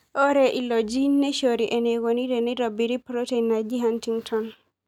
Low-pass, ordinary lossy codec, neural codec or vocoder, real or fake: 19.8 kHz; none; none; real